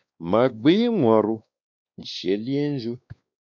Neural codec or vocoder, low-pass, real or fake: codec, 16 kHz, 2 kbps, X-Codec, WavLM features, trained on Multilingual LibriSpeech; 7.2 kHz; fake